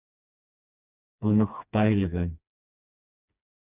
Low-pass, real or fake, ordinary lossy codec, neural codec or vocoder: 3.6 kHz; fake; Opus, 64 kbps; codec, 16 kHz, 2 kbps, FreqCodec, smaller model